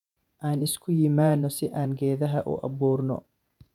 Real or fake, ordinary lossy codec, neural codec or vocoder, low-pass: fake; none; vocoder, 48 kHz, 128 mel bands, Vocos; 19.8 kHz